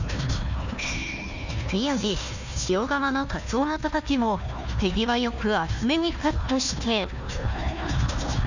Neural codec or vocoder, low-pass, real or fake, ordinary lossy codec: codec, 16 kHz, 1 kbps, FunCodec, trained on Chinese and English, 50 frames a second; 7.2 kHz; fake; none